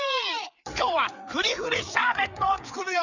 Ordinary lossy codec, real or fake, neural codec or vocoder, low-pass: none; fake; codec, 16 kHz, 4 kbps, FreqCodec, larger model; 7.2 kHz